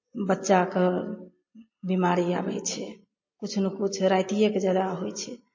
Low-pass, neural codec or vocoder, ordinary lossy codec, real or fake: 7.2 kHz; none; MP3, 32 kbps; real